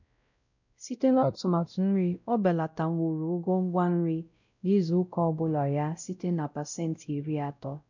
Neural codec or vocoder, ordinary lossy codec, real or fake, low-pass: codec, 16 kHz, 0.5 kbps, X-Codec, WavLM features, trained on Multilingual LibriSpeech; none; fake; 7.2 kHz